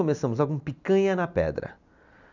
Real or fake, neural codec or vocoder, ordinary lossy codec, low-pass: real; none; none; 7.2 kHz